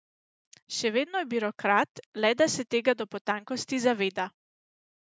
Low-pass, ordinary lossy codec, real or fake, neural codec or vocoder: none; none; real; none